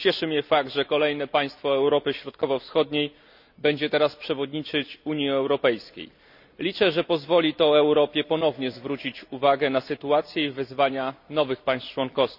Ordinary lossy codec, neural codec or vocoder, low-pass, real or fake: none; none; 5.4 kHz; real